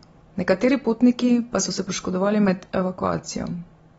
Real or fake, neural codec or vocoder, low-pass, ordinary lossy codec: real; none; 19.8 kHz; AAC, 24 kbps